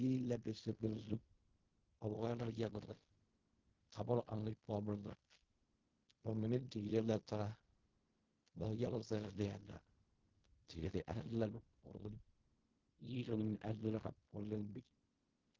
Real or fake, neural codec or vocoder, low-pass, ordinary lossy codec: fake; codec, 16 kHz in and 24 kHz out, 0.4 kbps, LongCat-Audio-Codec, fine tuned four codebook decoder; 7.2 kHz; Opus, 16 kbps